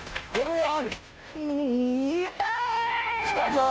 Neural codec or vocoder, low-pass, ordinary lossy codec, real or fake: codec, 16 kHz, 0.5 kbps, FunCodec, trained on Chinese and English, 25 frames a second; none; none; fake